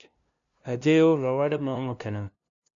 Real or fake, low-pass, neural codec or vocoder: fake; 7.2 kHz; codec, 16 kHz, 0.5 kbps, FunCodec, trained on LibriTTS, 25 frames a second